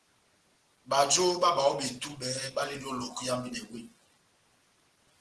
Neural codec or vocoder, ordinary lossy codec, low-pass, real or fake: none; Opus, 16 kbps; 10.8 kHz; real